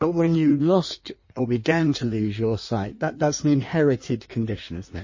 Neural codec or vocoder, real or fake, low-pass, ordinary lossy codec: codec, 16 kHz in and 24 kHz out, 1.1 kbps, FireRedTTS-2 codec; fake; 7.2 kHz; MP3, 32 kbps